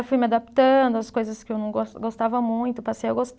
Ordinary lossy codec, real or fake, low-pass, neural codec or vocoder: none; real; none; none